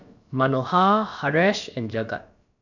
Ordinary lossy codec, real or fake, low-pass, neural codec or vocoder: none; fake; 7.2 kHz; codec, 16 kHz, about 1 kbps, DyCAST, with the encoder's durations